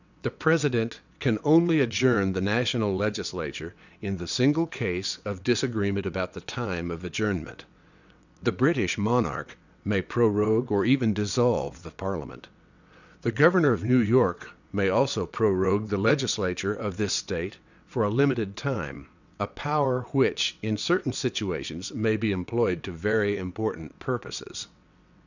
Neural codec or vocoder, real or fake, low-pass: vocoder, 22.05 kHz, 80 mel bands, WaveNeXt; fake; 7.2 kHz